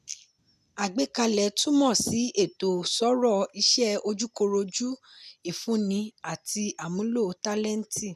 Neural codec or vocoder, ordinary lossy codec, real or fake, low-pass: none; none; real; 14.4 kHz